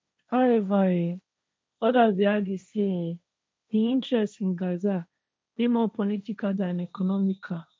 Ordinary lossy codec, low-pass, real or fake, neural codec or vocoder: none; none; fake; codec, 16 kHz, 1.1 kbps, Voila-Tokenizer